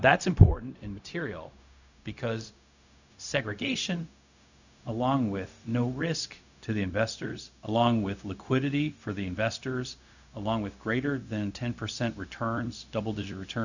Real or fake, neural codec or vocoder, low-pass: fake; codec, 16 kHz, 0.4 kbps, LongCat-Audio-Codec; 7.2 kHz